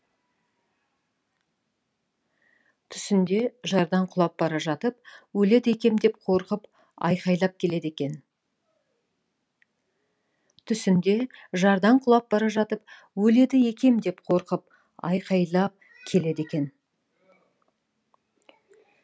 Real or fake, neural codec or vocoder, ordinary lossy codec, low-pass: real; none; none; none